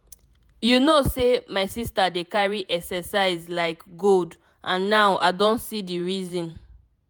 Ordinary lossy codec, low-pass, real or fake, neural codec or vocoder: none; none; fake; vocoder, 48 kHz, 128 mel bands, Vocos